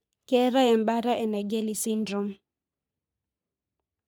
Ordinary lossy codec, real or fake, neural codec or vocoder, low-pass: none; fake; codec, 44.1 kHz, 3.4 kbps, Pupu-Codec; none